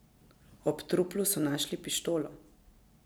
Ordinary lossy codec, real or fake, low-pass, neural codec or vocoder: none; real; none; none